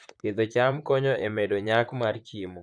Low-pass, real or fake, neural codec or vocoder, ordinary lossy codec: 9.9 kHz; fake; vocoder, 44.1 kHz, 128 mel bands, Pupu-Vocoder; none